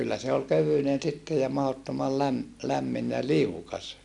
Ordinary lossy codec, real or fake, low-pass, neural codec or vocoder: none; real; 10.8 kHz; none